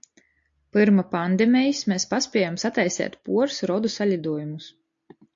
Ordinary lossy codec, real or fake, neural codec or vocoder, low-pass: AAC, 64 kbps; real; none; 7.2 kHz